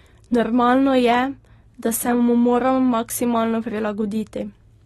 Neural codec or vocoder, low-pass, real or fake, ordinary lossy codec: none; 14.4 kHz; real; AAC, 32 kbps